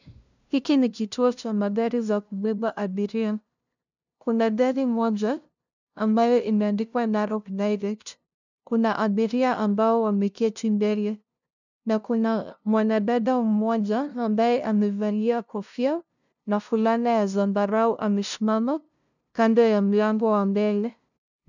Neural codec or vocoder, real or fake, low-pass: codec, 16 kHz, 0.5 kbps, FunCodec, trained on LibriTTS, 25 frames a second; fake; 7.2 kHz